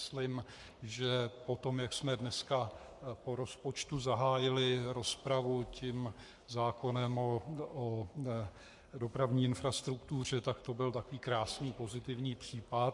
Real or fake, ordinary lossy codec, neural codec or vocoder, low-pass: fake; MP3, 64 kbps; codec, 44.1 kHz, 7.8 kbps, Pupu-Codec; 10.8 kHz